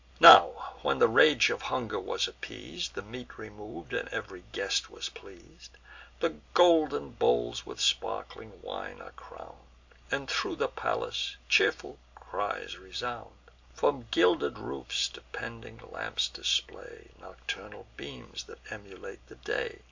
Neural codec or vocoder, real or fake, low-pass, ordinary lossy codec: none; real; 7.2 kHz; MP3, 64 kbps